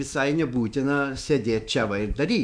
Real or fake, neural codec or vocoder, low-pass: fake; autoencoder, 48 kHz, 128 numbers a frame, DAC-VAE, trained on Japanese speech; 9.9 kHz